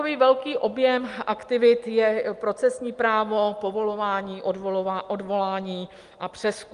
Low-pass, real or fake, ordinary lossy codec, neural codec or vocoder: 10.8 kHz; real; Opus, 32 kbps; none